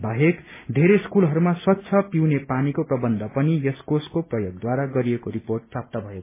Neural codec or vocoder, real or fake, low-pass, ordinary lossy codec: none; real; 3.6 kHz; MP3, 16 kbps